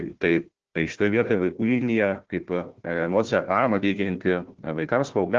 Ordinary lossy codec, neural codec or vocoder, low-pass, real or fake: Opus, 16 kbps; codec, 16 kHz, 1 kbps, FunCodec, trained on Chinese and English, 50 frames a second; 7.2 kHz; fake